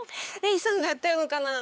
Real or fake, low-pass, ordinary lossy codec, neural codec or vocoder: fake; none; none; codec, 16 kHz, 4 kbps, X-Codec, HuBERT features, trained on LibriSpeech